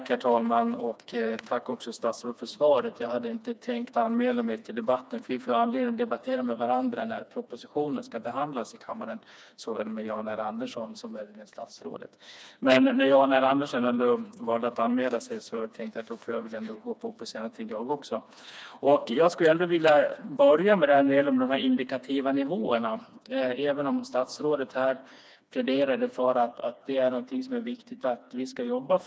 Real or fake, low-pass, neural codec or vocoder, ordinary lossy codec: fake; none; codec, 16 kHz, 2 kbps, FreqCodec, smaller model; none